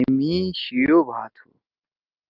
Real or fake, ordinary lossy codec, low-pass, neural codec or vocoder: real; Opus, 32 kbps; 5.4 kHz; none